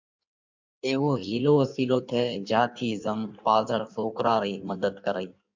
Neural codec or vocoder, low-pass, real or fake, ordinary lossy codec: codec, 16 kHz in and 24 kHz out, 1.1 kbps, FireRedTTS-2 codec; 7.2 kHz; fake; MP3, 64 kbps